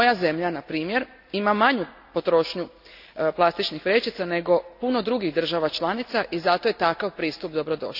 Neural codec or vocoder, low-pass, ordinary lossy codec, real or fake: none; 5.4 kHz; none; real